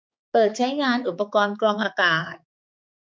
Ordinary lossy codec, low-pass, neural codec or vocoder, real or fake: none; none; codec, 16 kHz, 4 kbps, X-Codec, HuBERT features, trained on balanced general audio; fake